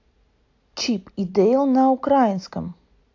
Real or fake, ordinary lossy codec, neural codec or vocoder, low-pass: real; AAC, 48 kbps; none; 7.2 kHz